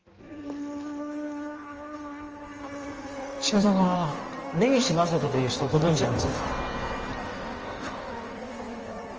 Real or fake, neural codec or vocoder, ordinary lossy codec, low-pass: fake; codec, 16 kHz in and 24 kHz out, 1.1 kbps, FireRedTTS-2 codec; Opus, 24 kbps; 7.2 kHz